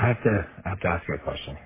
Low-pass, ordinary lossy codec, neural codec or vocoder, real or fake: 3.6 kHz; MP3, 16 kbps; codec, 44.1 kHz, 3.4 kbps, Pupu-Codec; fake